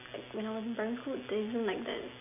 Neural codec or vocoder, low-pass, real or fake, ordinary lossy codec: none; 3.6 kHz; real; none